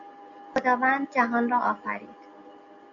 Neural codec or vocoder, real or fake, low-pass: none; real; 7.2 kHz